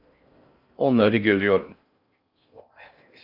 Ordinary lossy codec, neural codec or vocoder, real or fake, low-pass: Opus, 64 kbps; codec, 16 kHz in and 24 kHz out, 0.6 kbps, FocalCodec, streaming, 4096 codes; fake; 5.4 kHz